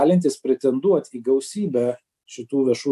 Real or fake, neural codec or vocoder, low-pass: real; none; 14.4 kHz